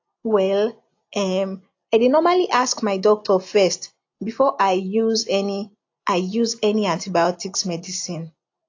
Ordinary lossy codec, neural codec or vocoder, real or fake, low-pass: AAC, 48 kbps; none; real; 7.2 kHz